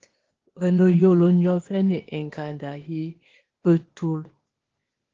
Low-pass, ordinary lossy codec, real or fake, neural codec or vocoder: 7.2 kHz; Opus, 16 kbps; fake; codec, 16 kHz, 0.8 kbps, ZipCodec